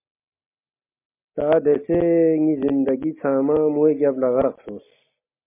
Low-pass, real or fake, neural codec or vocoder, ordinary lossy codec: 3.6 kHz; real; none; MP3, 24 kbps